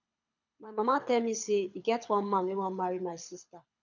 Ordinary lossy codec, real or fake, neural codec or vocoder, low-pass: none; fake; codec, 24 kHz, 6 kbps, HILCodec; 7.2 kHz